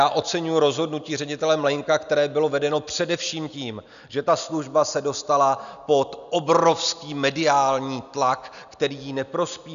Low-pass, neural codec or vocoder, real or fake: 7.2 kHz; none; real